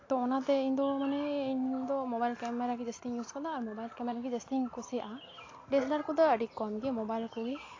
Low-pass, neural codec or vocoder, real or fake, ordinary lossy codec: 7.2 kHz; none; real; AAC, 32 kbps